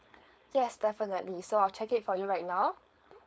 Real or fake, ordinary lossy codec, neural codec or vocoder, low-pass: fake; none; codec, 16 kHz, 4.8 kbps, FACodec; none